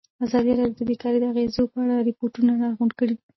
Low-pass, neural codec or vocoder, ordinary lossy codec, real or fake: 7.2 kHz; none; MP3, 24 kbps; real